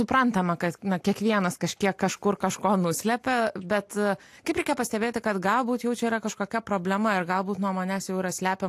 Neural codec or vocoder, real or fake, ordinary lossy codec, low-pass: none; real; AAC, 64 kbps; 14.4 kHz